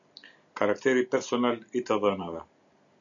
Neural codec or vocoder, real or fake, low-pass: none; real; 7.2 kHz